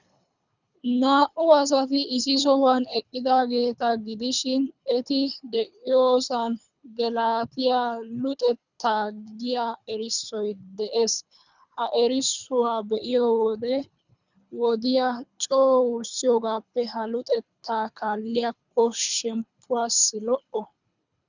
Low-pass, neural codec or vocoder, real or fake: 7.2 kHz; codec, 24 kHz, 3 kbps, HILCodec; fake